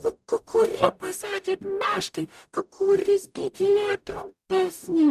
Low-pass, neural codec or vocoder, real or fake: 14.4 kHz; codec, 44.1 kHz, 0.9 kbps, DAC; fake